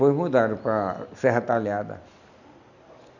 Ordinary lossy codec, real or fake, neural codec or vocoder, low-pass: none; real; none; 7.2 kHz